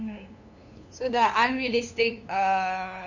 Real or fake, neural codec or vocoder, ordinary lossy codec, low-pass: fake; codec, 16 kHz, 2 kbps, FunCodec, trained on LibriTTS, 25 frames a second; none; 7.2 kHz